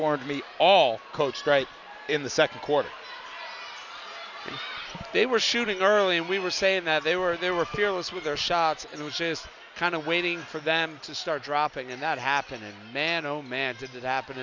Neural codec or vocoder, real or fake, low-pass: none; real; 7.2 kHz